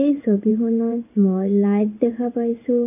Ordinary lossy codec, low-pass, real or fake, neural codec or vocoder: none; 3.6 kHz; fake; codec, 16 kHz in and 24 kHz out, 1 kbps, XY-Tokenizer